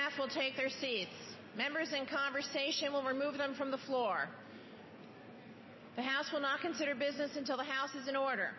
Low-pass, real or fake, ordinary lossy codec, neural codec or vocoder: 7.2 kHz; real; MP3, 24 kbps; none